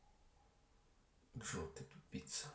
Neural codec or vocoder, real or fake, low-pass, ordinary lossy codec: none; real; none; none